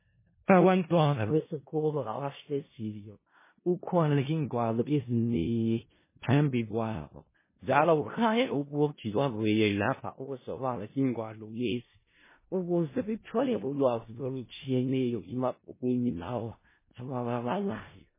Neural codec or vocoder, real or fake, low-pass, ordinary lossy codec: codec, 16 kHz in and 24 kHz out, 0.4 kbps, LongCat-Audio-Codec, four codebook decoder; fake; 3.6 kHz; MP3, 16 kbps